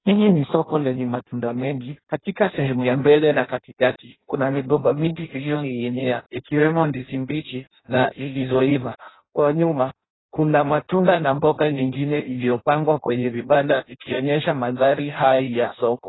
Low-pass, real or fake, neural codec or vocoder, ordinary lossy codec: 7.2 kHz; fake; codec, 16 kHz in and 24 kHz out, 0.6 kbps, FireRedTTS-2 codec; AAC, 16 kbps